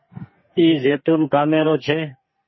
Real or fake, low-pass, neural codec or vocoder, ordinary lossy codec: fake; 7.2 kHz; codec, 44.1 kHz, 2.6 kbps, SNAC; MP3, 24 kbps